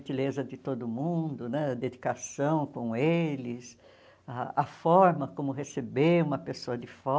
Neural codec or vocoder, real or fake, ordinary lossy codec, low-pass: none; real; none; none